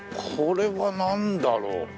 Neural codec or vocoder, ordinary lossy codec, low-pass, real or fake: none; none; none; real